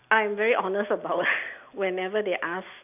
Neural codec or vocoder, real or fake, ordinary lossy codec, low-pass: none; real; none; 3.6 kHz